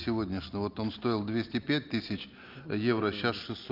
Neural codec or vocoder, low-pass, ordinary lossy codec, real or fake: none; 5.4 kHz; Opus, 24 kbps; real